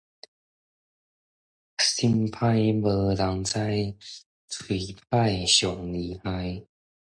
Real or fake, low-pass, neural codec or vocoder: real; 9.9 kHz; none